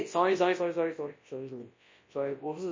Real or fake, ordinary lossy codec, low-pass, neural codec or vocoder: fake; MP3, 32 kbps; 7.2 kHz; codec, 24 kHz, 0.9 kbps, WavTokenizer, large speech release